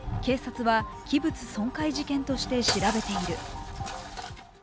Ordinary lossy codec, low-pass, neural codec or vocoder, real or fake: none; none; none; real